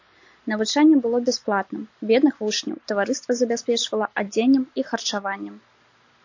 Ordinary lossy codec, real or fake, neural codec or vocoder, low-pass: AAC, 48 kbps; real; none; 7.2 kHz